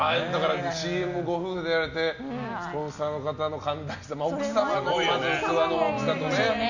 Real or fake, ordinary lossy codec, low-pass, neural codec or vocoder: real; none; 7.2 kHz; none